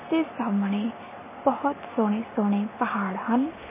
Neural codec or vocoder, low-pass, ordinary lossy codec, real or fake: none; 3.6 kHz; MP3, 16 kbps; real